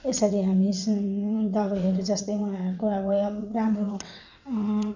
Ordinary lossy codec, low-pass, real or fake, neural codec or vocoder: none; 7.2 kHz; fake; vocoder, 22.05 kHz, 80 mel bands, WaveNeXt